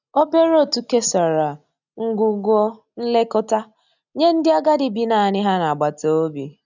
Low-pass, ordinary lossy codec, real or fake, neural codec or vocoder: 7.2 kHz; none; real; none